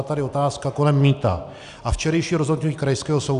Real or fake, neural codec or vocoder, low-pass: real; none; 10.8 kHz